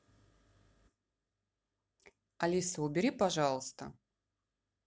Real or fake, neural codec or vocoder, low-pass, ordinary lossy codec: real; none; none; none